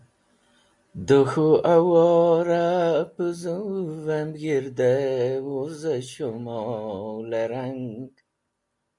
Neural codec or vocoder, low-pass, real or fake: none; 10.8 kHz; real